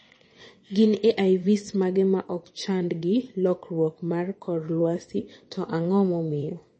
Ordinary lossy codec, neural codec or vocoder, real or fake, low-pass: MP3, 32 kbps; vocoder, 22.05 kHz, 80 mel bands, WaveNeXt; fake; 9.9 kHz